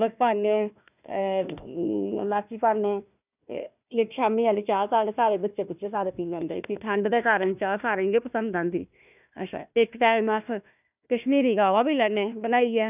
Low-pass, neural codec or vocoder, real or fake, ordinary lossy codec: 3.6 kHz; codec, 16 kHz, 1 kbps, FunCodec, trained on Chinese and English, 50 frames a second; fake; none